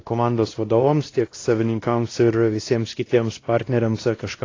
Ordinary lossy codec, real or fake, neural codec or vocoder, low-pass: AAC, 32 kbps; fake; codec, 16 kHz, 1 kbps, X-Codec, WavLM features, trained on Multilingual LibriSpeech; 7.2 kHz